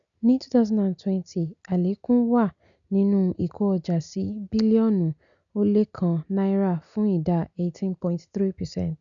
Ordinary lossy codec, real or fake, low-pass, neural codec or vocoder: none; real; 7.2 kHz; none